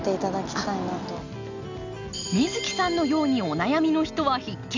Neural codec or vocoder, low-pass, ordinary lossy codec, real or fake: none; 7.2 kHz; none; real